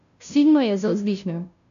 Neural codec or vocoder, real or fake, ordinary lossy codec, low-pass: codec, 16 kHz, 0.5 kbps, FunCodec, trained on Chinese and English, 25 frames a second; fake; none; 7.2 kHz